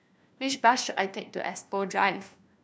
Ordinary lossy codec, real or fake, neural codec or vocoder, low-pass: none; fake; codec, 16 kHz, 1 kbps, FunCodec, trained on LibriTTS, 50 frames a second; none